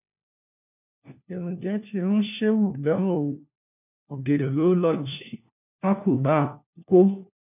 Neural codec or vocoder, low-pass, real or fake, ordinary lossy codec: codec, 16 kHz, 1 kbps, FunCodec, trained on LibriTTS, 50 frames a second; 3.6 kHz; fake; none